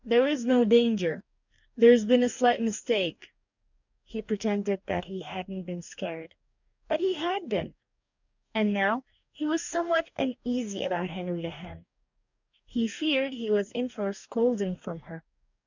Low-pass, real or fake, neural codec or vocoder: 7.2 kHz; fake; codec, 44.1 kHz, 2.6 kbps, DAC